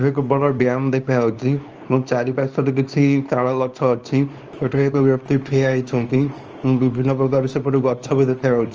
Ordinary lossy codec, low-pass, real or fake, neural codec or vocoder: Opus, 24 kbps; 7.2 kHz; fake; codec, 24 kHz, 0.9 kbps, WavTokenizer, small release